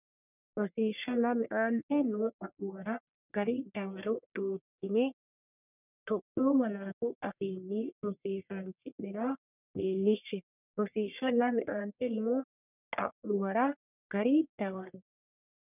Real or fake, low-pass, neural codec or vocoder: fake; 3.6 kHz; codec, 44.1 kHz, 1.7 kbps, Pupu-Codec